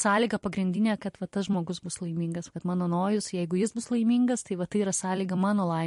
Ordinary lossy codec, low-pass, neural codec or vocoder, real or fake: MP3, 48 kbps; 14.4 kHz; vocoder, 44.1 kHz, 128 mel bands every 256 samples, BigVGAN v2; fake